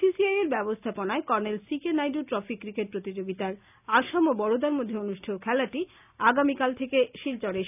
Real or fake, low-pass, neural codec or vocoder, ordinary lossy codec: real; 3.6 kHz; none; none